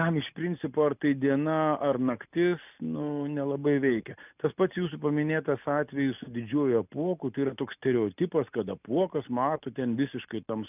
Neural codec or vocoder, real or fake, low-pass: none; real; 3.6 kHz